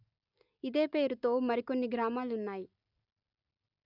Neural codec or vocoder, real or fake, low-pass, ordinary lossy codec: none; real; 5.4 kHz; none